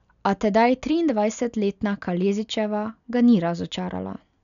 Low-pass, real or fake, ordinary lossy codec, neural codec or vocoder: 7.2 kHz; real; none; none